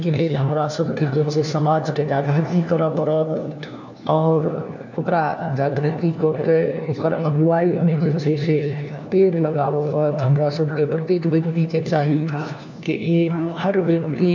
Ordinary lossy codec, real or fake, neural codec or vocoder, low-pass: none; fake; codec, 16 kHz, 1 kbps, FunCodec, trained on LibriTTS, 50 frames a second; 7.2 kHz